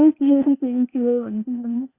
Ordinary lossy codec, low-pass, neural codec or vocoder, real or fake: Opus, 64 kbps; 3.6 kHz; codec, 16 kHz, 0.8 kbps, ZipCodec; fake